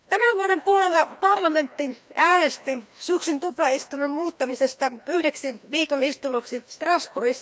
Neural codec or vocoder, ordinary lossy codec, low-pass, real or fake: codec, 16 kHz, 1 kbps, FreqCodec, larger model; none; none; fake